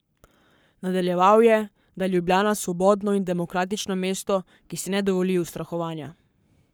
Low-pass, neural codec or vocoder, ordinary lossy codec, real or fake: none; codec, 44.1 kHz, 7.8 kbps, Pupu-Codec; none; fake